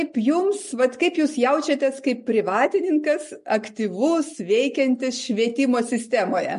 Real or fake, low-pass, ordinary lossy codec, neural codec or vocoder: real; 10.8 kHz; MP3, 48 kbps; none